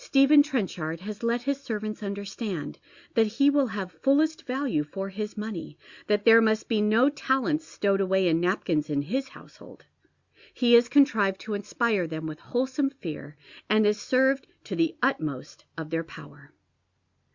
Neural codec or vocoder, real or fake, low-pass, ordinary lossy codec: none; real; 7.2 kHz; Opus, 64 kbps